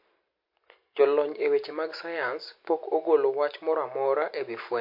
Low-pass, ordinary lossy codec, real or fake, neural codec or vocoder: 5.4 kHz; MP3, 32 kbps; real; none